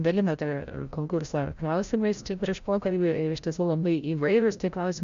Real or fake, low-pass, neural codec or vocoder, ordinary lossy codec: fake; 7.2 kHz; codec, 16 kHz, 0.5 kbps, FreqCodec, larger model; Opus, 64 kbps